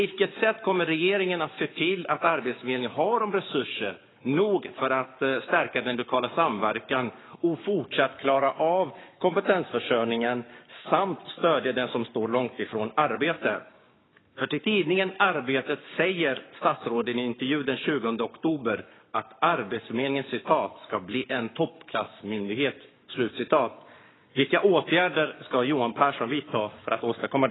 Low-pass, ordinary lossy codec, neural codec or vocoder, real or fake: 7.2 kHz; AAC, 16 kbps; codec, 44.1 kHz, 7.8 kbps, Pupu-Codec; fake